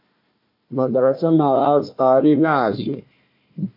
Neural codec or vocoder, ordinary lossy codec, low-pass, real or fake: codec, 16 kHz, 1 kbps, FunCodec, trained on Chinese and English, 50 frames a second; MP3, 32 kbps; 5.4 kHz; fake